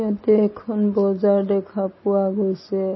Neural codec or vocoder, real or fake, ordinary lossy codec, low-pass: none; real; MP3, 24 kbps; 7.2 kHz